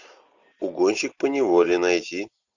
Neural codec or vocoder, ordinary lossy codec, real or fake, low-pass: none; Opus, 64 kbps; real; 7.2 kHz